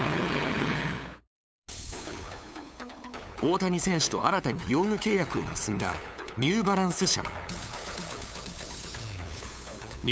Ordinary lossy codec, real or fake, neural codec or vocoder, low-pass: none; fake; codec, 16 kHz, 8 kbps, FunCodec, trained on LibriTTS, 25 frames a second; none